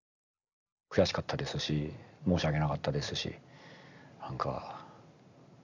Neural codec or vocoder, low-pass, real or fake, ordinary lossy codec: none; 7.2 kHz; real; none